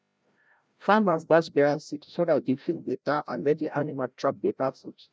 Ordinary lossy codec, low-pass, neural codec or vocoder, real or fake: none; none; codec, 16 kHz, 0.5 kbps, FreqCodec, larger model; fake